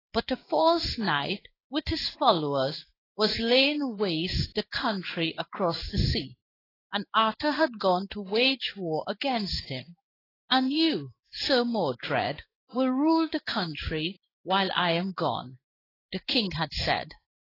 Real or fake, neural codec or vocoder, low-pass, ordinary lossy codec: fake; codec, 16 kHz in and 24 kHz out, 1 kbps, XY-Tokenizer; 5.4 kHz; AAC, 24 kbps